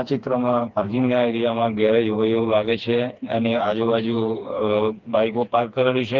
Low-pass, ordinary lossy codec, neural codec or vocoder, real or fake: 7.2 kHz; Opus, 24 kbps; codec, 16 kHz, 2 kbps, FreqCodec, smaller model; fake